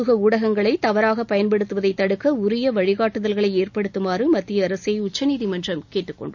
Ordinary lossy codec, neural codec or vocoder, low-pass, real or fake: none; none; 7.2 kHz; real